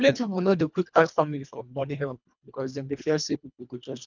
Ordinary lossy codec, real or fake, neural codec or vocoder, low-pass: none; fake; codec, 24 kHz, 1.5 kbps, HILCodec; 7.2 kHz